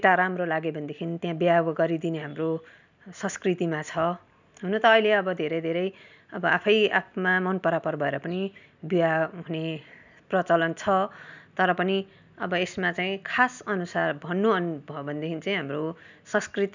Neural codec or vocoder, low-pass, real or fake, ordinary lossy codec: none; 7.2 kHz; real; none